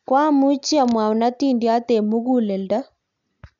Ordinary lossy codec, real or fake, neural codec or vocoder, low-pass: none; real; none; 7.2 kHz